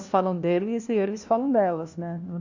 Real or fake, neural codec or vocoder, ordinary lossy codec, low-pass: fake; codec, 16 kHz, 1 kbps, FunCodec, trained on LibriTTS, 50 frames a second; none; 7.2 kHz